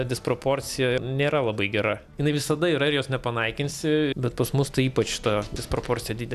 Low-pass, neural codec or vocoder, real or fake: 14.4 kHz; none; real